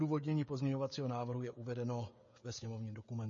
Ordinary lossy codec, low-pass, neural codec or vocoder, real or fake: MP3, 32 kbps; 7.2 kHz; codec, 16 kHz, 16 kbps, FreqCodec, smaller model; fake